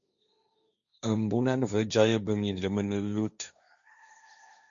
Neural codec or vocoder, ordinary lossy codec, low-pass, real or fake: codec, 16 kHz, 1.1 kbps, Voila-Tokenizer; MP3, 96 kbps; 7.2 kHz; fake